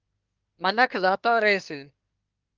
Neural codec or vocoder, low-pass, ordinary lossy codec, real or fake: codec, 24 kHz, 1 kbps, SNAC; 7.2 kHz; Opus, 24 kbps; fake